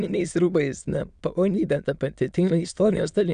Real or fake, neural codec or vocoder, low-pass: fake; autoencoder, 22.05 kHz, a latent of 192 numbers a frame, VITS, trained on many speakers; 9.9 kHz